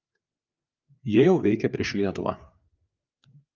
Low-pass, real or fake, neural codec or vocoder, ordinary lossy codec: 7.2 kHz; fake; codec, 16 kHz, 4 kbps, FreqCodec, larger model; Opus, 24 kbps